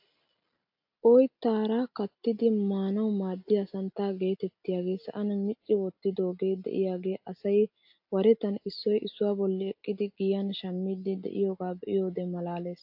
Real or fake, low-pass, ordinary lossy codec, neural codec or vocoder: real; 5.4 kHz; AAC, 48 kbps; none